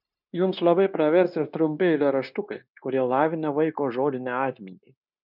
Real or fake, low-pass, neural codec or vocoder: fake; 5.4 kHz; codec, 16 kHz, 0.9 kbps, LongCat-Audio-Codec